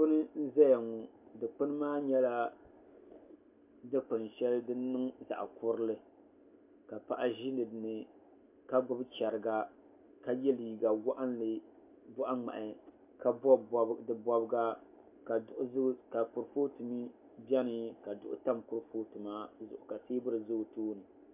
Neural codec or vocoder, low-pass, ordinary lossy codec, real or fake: none; 3.6 kHz; MP3, 32 kbps; real